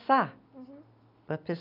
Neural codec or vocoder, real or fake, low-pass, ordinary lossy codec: none; real; 5.4 kHz; none